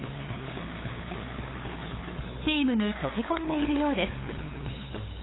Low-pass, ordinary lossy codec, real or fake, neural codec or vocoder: 7.2 kHz; AAC, 16 kbps; fake; codec, 16 kHz, 8 kbps, FunCodec, trained on LibriTTS, 25 frames a second